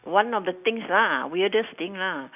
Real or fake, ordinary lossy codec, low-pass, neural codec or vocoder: real; none; 3.6 kHz; none